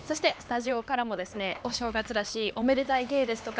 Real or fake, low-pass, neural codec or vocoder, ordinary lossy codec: fake; none; codec, 16 kHz, 2 kbps, X-Codec, HuBERT features, trained on LibriSpeech; none